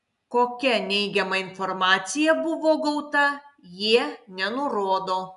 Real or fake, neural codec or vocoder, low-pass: real; none; 10.8 kHz